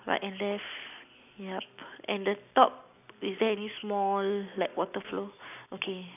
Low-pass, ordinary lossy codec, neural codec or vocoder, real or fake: 3.6 kHz; none; none; real